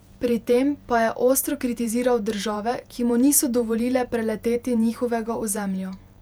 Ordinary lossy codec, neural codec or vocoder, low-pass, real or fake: none; vocoder, 48 kHz, 128 mel bands, Vocos; 19.8 kHz; fake